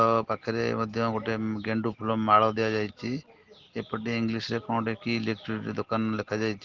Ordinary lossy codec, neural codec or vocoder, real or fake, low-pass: Opus, 16 kbps; none; real; 7.2 kHz